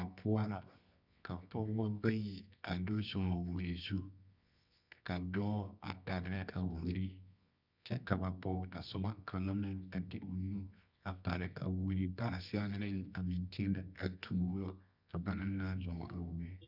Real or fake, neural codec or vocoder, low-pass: fake; codec, 24 kHz, 0.9 kbps, WavTokenizer, medium music audio release; 5.4 kHz